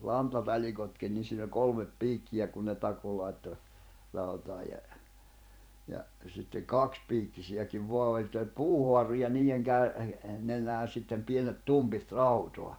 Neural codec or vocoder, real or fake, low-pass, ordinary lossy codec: vocoder, 44.1 kHz, 128 mel bands every 512 samples, BigVGAN v2; fake; none; none